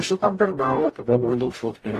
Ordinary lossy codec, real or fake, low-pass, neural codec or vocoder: AAC, 48 kbps; fake; 14.4 kHz; codec, 44.1 kHz, 0.9 kbps, DAC